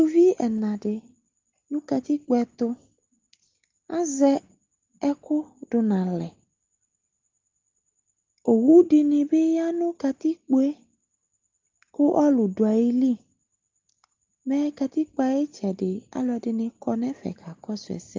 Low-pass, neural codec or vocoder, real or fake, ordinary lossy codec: 7.2 kHz; none; real; Opus, 32 kbps